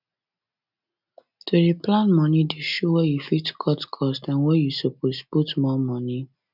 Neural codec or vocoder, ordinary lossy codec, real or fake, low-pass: none; none; real; 5.4 kHz